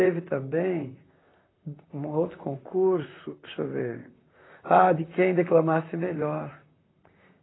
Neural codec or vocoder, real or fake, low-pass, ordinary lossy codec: vocoder, 44.1 kHz, 128 mel bands, Pupu-Vocoder; fake; 7.2 kHz; AAC, 16 kbps